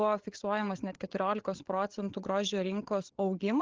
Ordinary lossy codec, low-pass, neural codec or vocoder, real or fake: Opus, 16 kbps; 7.2 kHz; codec, 16 kHz, 8 kbps, FreqCodec, larger model; fake